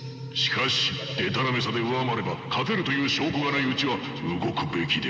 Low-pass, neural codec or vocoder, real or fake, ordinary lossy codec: none; none; real; none